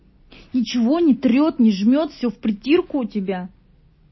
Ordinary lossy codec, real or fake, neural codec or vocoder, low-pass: MP3, 24 kbps; real; none; 7.2 kHz